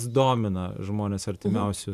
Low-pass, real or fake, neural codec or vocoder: 14.4 kHz; fake; vocoder, 44.1 kHz, 128 mel bands every 512 samples, BigVGAN v2